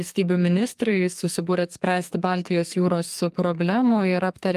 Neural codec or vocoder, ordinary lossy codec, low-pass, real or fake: codec, 44.1 kHz, 2.6 kbps, SNAC; Opus, 32 kbps; 14.4 kHz; fake